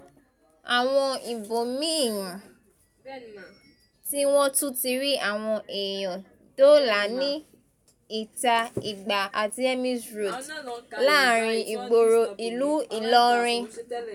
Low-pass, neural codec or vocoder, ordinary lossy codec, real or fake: 19.8 kHz; none; none; real